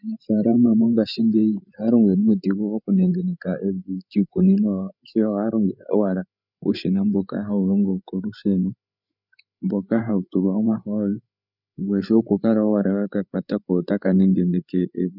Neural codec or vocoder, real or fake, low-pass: codec, 16 kHz, 8 kbps, FreqCodec, larger model; fake; 5.4 kHz